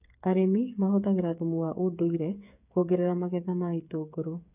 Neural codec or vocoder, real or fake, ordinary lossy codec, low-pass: codec, 16 kHz, 8 kbps, FreqCodec, smaller model; fake; none; 3.6 kHz